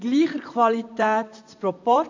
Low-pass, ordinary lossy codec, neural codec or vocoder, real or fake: 7.2 kHz; AAC, 48 kbps; vocoder, 44.1 kHz, 128 mel bands, Pupu-Vocoder; fake